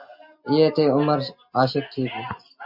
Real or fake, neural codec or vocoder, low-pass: real; none; 5.4 kHz